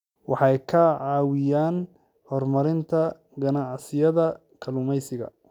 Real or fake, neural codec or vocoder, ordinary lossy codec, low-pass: real; none; none; 19.8 kHz